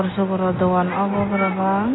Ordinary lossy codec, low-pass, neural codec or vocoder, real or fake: AAC, 16 kbps; 7.2 kHz; none; real